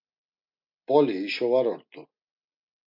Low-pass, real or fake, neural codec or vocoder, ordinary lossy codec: 5.4 kHz; real; none; MP3, 48 kbps